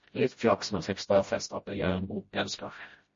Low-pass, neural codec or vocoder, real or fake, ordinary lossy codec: 7.2 kHz; codec, 16 kHz, 0.5 kbps, FreqCodec, smaller model; fake; MP3, 32 kbps